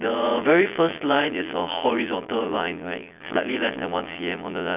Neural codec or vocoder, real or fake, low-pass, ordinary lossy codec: vocoder, 22.05 kHz, 80 mel bands, Vocos; fake; 3.6 kHz; none